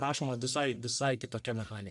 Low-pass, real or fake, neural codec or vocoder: 10.8 kHz; fake; codec, 44.1 kHz, 1.7 kbps, Pupu-Codec